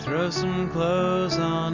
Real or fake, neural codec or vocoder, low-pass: real; none; 7.2 kHz